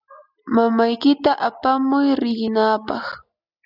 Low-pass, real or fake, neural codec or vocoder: 5.4 kHz; real; none